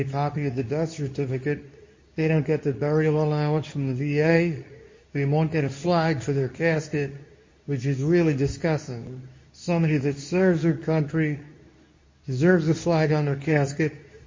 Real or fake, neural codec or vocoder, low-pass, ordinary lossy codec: fake; codec, 24 kHz, 0.9 kbps, WavTokenizer, medium speech release version 2; 7.2 kHz; MP3, 32 kbps